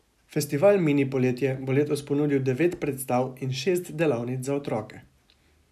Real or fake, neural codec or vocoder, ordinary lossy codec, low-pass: real; none; none; 14.4 kHz